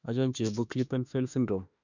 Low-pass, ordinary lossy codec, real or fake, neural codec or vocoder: 7.2 kHz; none; fake; autoencoder, 48 kHz, 32 numbers a frame, DAC-VAE, trained on Japanese speech